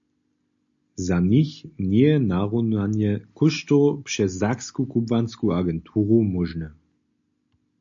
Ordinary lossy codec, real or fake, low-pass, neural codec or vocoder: AAC, 64 kbps; real; 7.2 kHz; none